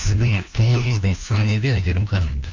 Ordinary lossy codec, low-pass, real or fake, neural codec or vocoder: MP3, 64 kbps; 7.2 kHz; fake; codec, 16 kHz, 1 kbps, FunCodec, trained on LibriTTS, 50 frames a second